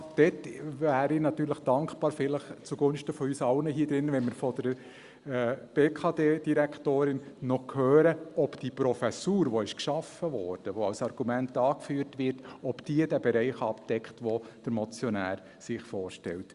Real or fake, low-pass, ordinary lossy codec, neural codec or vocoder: real; 10.8 kHz; Opus, 64 kbps; none